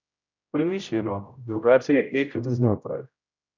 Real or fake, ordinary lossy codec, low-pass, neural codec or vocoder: fake; none; 7.2 kHz; codec, 16 kHz, 0.5 kbps, X-Codec, HuBERT features, trained on general audio